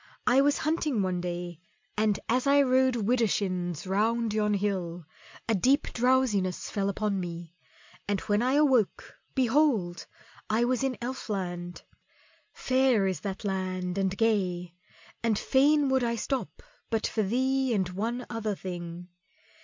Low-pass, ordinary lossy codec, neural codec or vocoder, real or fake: 7.2 kHz; MP3, 64 kbps; none; real